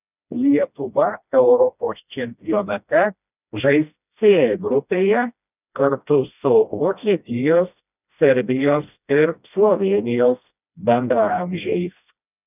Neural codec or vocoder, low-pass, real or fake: codec, 16 kHz, 1 kbps, FreqCodec, smaller model; 3.6 kHz; fake